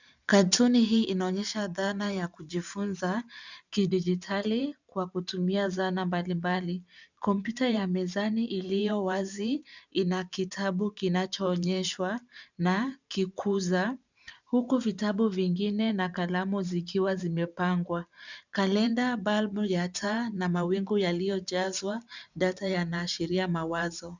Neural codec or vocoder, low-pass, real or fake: vocoder, 44.1 kHz, 128 mel bands every 512 samples, BigVGAN v2; 7.2 kHz; fake